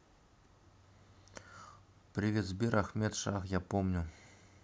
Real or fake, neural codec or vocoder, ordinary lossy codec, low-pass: real; none; none; none